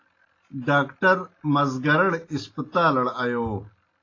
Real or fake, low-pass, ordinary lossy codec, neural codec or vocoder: real; 7.2 kHz; AAC, 32 kbps; none